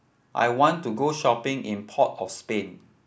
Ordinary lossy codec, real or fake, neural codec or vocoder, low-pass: none; real; none; none